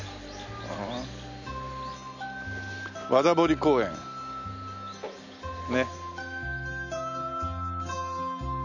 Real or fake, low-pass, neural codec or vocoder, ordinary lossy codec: real; 7.2 kHz; none; none